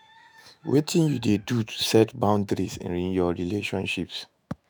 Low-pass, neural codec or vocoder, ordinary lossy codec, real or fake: none; autoencoder, 48 kHz, 128 numbers a frame, DAC-VAE, trained on Japanese speech; none; fake